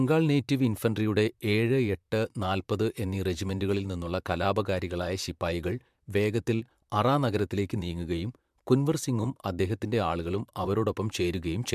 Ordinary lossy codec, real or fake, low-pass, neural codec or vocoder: MP3, 96 kbps; fake; 14.4 kHz; vocoder, 44.1 kHz, 128 mel bands, Pupu-Vocoder